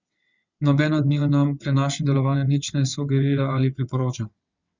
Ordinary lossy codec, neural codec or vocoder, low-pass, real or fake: Opus, 64 kbps; vocoder, 22.05 kHz, 80 mel bands, WaveNeXt; 7.2 kHz; fake